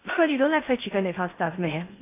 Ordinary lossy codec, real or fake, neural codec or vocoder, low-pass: AAC, 24 kbps; fake; codec, 16 kHz in and 24 kHz out, 0.6 kbps, FocalCodec, streaming, 4096 codes; 3.6 kHz